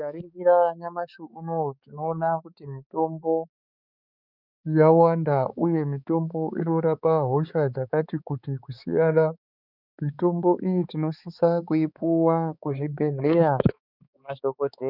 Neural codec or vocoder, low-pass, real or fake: codec, 16 kHz, 4 kbps, X-Codec, HuBERT features, trained on balanced general audio; 5.4 kHz; fake